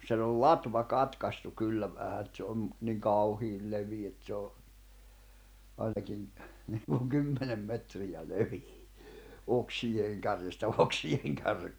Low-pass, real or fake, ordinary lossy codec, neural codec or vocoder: none; real; none; none